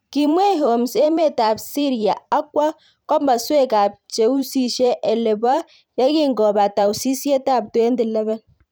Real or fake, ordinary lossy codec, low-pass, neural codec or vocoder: fake; none; none; vocoder, 44.1 kHz, 128 mel bands every 512 samples, BigVGAN v2